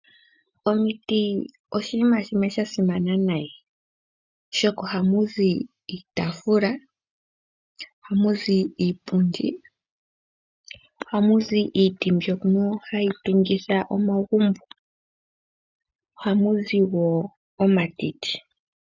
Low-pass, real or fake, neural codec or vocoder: 7.2 kHz; real; none